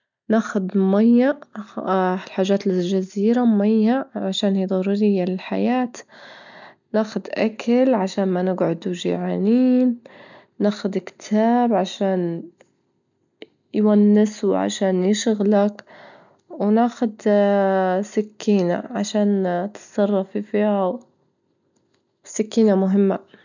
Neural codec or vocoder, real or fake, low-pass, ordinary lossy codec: none; real; 7.2 kHz; none